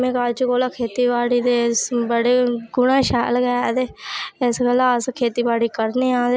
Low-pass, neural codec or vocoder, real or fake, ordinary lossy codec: none; none; real; none